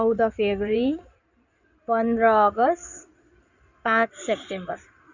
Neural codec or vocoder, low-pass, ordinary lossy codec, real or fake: codec, 16 kHz in and 24 kHz out, 2.2 kbps, FireRedTTS-2 codec; 7.2 kHz; none; fake